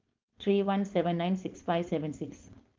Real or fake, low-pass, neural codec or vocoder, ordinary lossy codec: fake; 7.2 kHz; codec, 16 kHz, 4.8 kbps, FACodec; Opus, 24 kbps